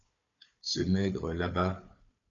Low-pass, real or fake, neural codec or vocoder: 7.2 kHz; fake; codec, 16 kHz, 4 kbps, FunCodec, trained on Chinese and English, 50 frames a second